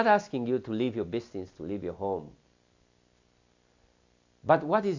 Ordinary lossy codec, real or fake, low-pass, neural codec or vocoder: AAC, 48 kbps; real; 7.2 kHz; none